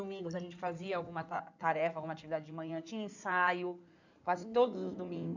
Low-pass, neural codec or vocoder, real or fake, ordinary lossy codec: 7.2 kHz; codec, 16 kHz in and 24 kHz out, 2.2 kbps, FireRedTTS-2 codec; fake; none